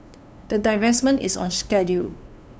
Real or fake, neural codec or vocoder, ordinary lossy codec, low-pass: fake; codec, 16 kHz, 2 kbps, FunCodec, trained on LibriTTS, 25 frames a second; none; none